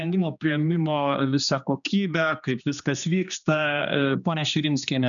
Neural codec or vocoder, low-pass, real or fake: codec, 16 kHz, 2 kbps, X-Codec, HuBERT features, trained on general audio; 7.2 kHz; fake